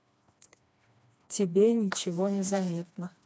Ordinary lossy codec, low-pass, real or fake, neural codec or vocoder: none; none; fake; codec, 16 kHz, 2 kbps, FreqCodec, smaller model